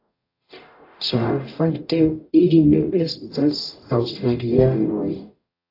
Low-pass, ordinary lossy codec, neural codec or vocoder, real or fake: 5.4 kHz; AAC, 32 kbps; codec, 44.1 kHz, 0.9 kbps, DAC; fake